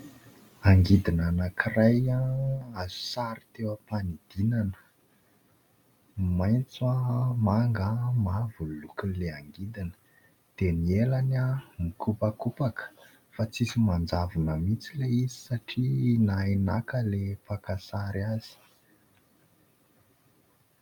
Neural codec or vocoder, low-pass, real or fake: none; 19.8 kHz; real